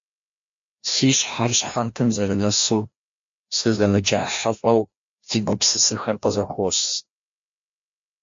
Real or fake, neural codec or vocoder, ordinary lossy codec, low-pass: fake; codec, 16 kHz, 1 kbps, FreqCodec, larger model; MP3, 48 kbps; 7.2 kHz